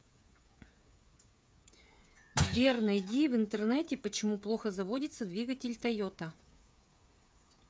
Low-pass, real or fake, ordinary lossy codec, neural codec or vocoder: none; fake; none; codec, 16 kHz, 8 kbps, FreqCodec, smaller model